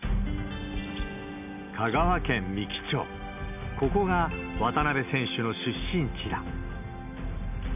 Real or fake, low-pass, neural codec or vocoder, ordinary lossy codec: real; 3.6 kHz; none; none